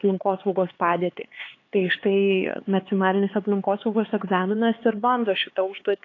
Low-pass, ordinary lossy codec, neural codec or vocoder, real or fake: 7.2 kHz; AAC, 32 kbps; codec, 16 kHz, 4 kbps, X-Codec, HuBERT features, trained on LibriSpeech; fake